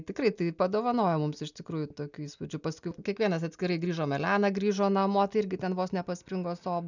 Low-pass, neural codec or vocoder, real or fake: 7.2 kHz; none; real